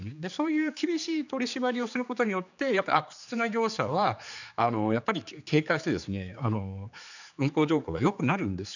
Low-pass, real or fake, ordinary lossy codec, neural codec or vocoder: 7.2 kHz; fake; none; codec, 16 kHz, 4 kbps, X-Codec, HuBERT features, trained on general audio